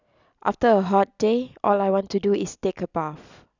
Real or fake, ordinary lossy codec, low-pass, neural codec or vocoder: real; none; 7.2 kHz; none